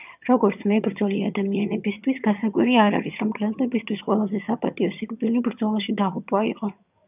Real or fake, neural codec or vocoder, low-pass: fake; vocoder, 22.05 kHz, 80 mel bands, HiFi-GAN; 3.6 kHz